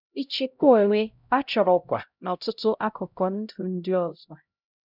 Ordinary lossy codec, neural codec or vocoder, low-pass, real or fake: none; codec, 16 kHz, 0.5 kbps, X-Codec, HuBERT features, trained on LibriSpeech; 5.4 kHz; fake